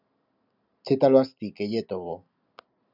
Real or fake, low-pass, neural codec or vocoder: real; 5.4 kHz; none